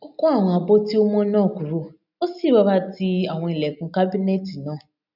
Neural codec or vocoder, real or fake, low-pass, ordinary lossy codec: none; real; 5.4 kHz; none